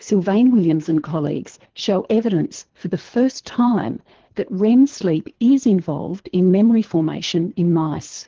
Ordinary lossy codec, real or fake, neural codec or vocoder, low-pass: Opus, 16 kbps; fake; codec, 24 kHz, 3 kbps, HILCodec; 7.2 kHz